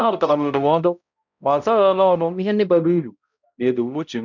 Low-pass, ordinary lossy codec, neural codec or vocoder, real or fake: 7.2 kHz; none; codec, 16 kHz, 0.5 kbps, X-Codec, HuBERT features, trained on balanced general audio; fake